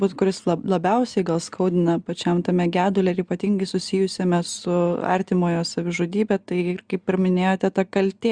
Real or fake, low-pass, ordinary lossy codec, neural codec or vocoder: real; 9.9 kHz; Opus, 64 kbps; none